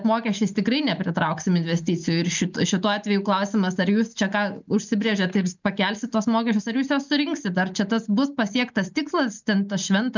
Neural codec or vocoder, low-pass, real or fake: none; 7.2 kHz; real